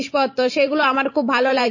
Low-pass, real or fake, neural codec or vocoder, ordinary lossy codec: 7.2 kHz; fake; vocoder, 44.1 kHz, 128 mel bands every 512 samples, BigVGAN v2; MP3, 32 kbps